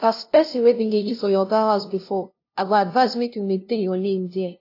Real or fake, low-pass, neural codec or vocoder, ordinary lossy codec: fake; 5.4 kHz; codec, 16 kHz, 0.5 kbps, FunCodec, trained on LibriTTS, 25 frames a second; AAC, 32 kbps